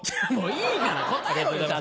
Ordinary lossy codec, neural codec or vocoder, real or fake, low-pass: none; none; real; none